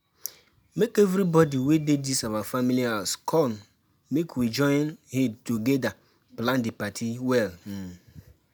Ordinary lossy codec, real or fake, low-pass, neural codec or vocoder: none; real; none; none